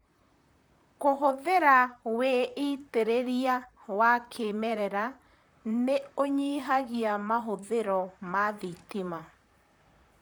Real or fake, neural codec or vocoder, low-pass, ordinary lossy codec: fake; vocoder, 44.1 kHz, 128 mel bands, Pupu-Vocoder; none; none